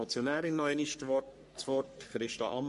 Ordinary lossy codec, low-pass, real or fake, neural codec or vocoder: MP3, 48 kbps; 14.4 kHz; fake; codec, 44.1 kHz, 3.4 kbps, Pupu-Codec